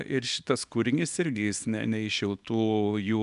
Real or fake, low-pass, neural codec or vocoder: fake; 10.8 kHz; codec, 24 kHz, 0.9 kbps, WavTokenizer, small release